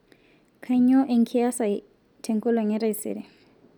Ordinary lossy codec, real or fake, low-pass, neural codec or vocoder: none; real; 19.8 kHz; none